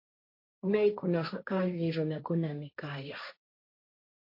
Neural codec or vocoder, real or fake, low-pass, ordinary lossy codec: codec, 16 kHz, 1.1 kbps, Voila-Tokenizer; fake; 5.4 kHz; MP3, 32 kbps